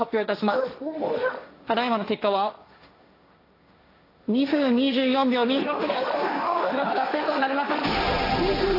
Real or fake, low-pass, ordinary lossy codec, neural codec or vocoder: fake; 5.4 kHz; AAC, 32 kbps; codec, 16 kHz, 1.1 kbps, Voila-Tokenizer